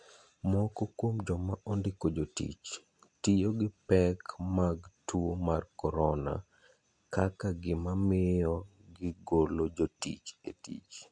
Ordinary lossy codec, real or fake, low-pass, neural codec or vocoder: MP3, 64 kbps; real; 9.9 kHz; none